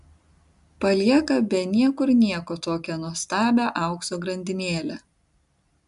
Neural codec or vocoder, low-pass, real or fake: none; 10.8 kHz; real